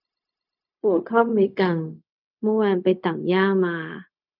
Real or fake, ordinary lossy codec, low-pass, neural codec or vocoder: fake; none; 5.4 kHz; codec, 16 kHz, 0.4 kbps, LongCat-Audio-Codec